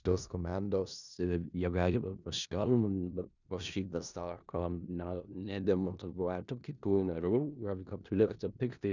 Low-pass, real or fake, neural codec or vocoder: 7.2 kHz; fake; codec, 16 kHz in and 24 kHz out, 0.4 kbps, LongCat-Audio-Codec, four codebook decoder